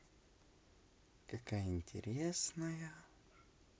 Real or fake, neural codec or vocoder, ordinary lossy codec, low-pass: real; none; none; none